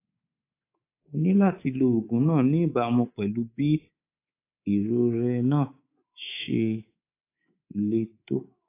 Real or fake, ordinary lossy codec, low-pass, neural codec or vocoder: fake; AAC, 24 kbps; 3.6 kHz; codec, 24 kHz, 3.1 kbps, DualCodec